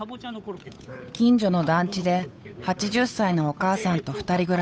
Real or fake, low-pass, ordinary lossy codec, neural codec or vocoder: fake; none; none; codec, 16 kHz, 8 kbps, FunCodec, trained on Chinese and English, 25 frames a second